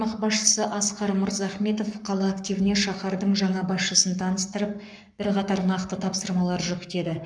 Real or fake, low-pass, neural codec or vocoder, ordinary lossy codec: fake; 9.9 kHz; codec, 44.1 kHz, 7.8 kbps, Pupu-Codec; none